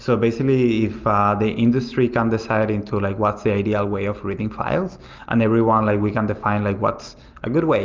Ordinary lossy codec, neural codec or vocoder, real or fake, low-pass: Opus, 24 kbps; none; real; 7.2 kHz